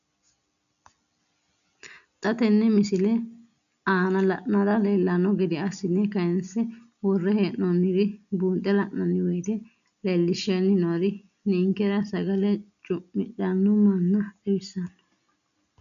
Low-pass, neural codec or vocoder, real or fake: 7.2 kHz; none; real